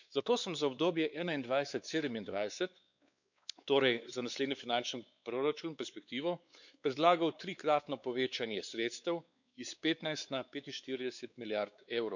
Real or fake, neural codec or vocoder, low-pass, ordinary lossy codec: fake; codec, 16 kHz, 4 kbps, X-Codec, WavLM features, trained on Multilingual LibriSpeech; 7.2 kHz; none